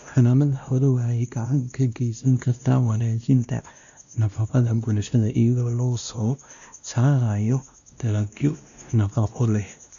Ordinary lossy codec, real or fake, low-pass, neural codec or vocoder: none; fake; 7.2 kHz; codec, 16 kHz, 1 kbps, X-Codec, WavLM features, trained on Multilingual LibriSpeech